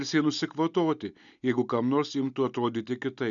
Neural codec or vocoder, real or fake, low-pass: none; real; 7.2 kHz